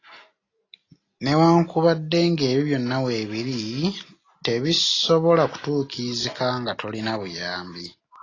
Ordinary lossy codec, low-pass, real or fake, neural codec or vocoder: AAC, 32 kbps; 7.2 kHz; real; none